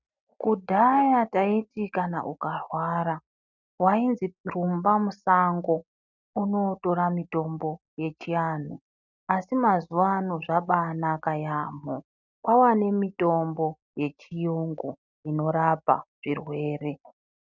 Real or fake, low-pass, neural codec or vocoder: real; 7.2 kHz; none